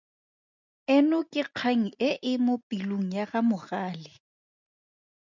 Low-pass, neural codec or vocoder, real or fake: 7.2 kHz; none; real